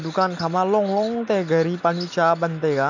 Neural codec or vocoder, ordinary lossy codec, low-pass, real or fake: none; none; 7.2 kHz; real